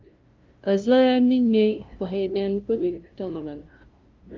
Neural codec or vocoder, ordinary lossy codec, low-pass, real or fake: codec, 16 kHz, 0.5 kbps, FunCodec, trained on LibriTTS, 25 frames a second; Opus, 24 kbps; 7.2 kHz; fake